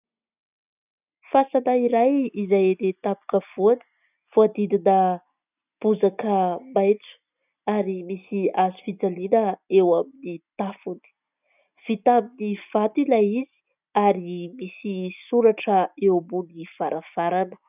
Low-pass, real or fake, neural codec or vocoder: 3.6 kHz; real; none